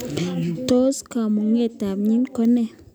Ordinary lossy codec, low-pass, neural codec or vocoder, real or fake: none; none; none; real